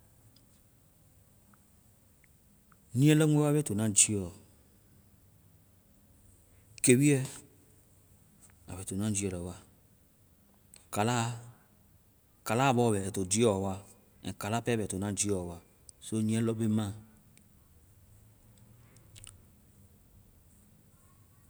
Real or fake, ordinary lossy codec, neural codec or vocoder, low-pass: real; none; none; none